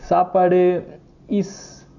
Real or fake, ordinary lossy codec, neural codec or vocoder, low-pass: real; none; none; 7.2 kHz